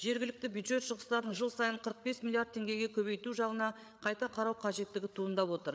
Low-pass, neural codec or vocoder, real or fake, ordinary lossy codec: none; codec, 16 kHz, 8 kbps, FreqCodec, larger model; fake; none